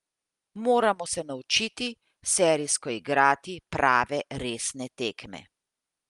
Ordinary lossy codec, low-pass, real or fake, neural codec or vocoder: Opus, 32 kbps; 10.8 kHz; real; none